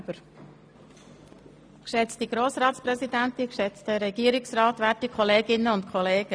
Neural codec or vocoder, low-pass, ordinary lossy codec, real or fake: none; none; none; real